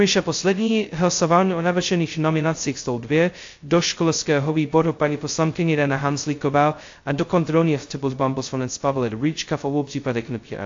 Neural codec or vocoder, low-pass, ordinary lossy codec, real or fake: codec, 16 kHz, 0.2 kbps, FocalCodec; 7.2 kHz; AAC, 48 kbps; fake